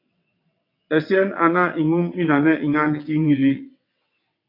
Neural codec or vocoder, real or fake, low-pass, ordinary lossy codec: vocoder, 22.05 kHz, 80 mel bands, WaveNeXt; fake; 5.4 kHz; AAC, 32 kbps